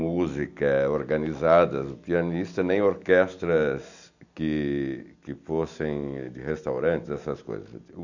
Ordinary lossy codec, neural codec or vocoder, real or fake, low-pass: none; none; real; 7.2 kHz